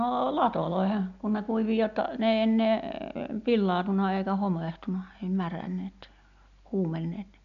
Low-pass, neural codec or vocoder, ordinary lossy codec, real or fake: 7.2 kHz; none; none; real